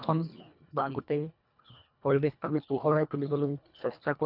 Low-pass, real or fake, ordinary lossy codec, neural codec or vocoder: 5.4 kHz; fake; none; codec, 24 kHz, 1.5 kbps, HILCodec